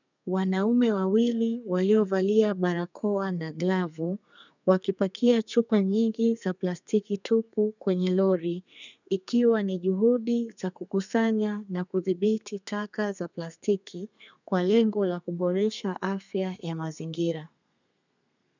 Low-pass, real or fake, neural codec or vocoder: 7.2 kHz; fake; codec, 32 kHz, 1.9 kbps, SNAC